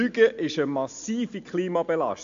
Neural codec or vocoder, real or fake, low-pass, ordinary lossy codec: none; real; 7.2 kHz; AAC, 64 kbps